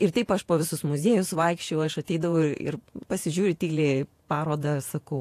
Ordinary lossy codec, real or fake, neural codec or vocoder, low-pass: AAC, 64 kbps; fake; vocoder, 48 kHz, 128 mel bands, Vocos; 14.4 kHz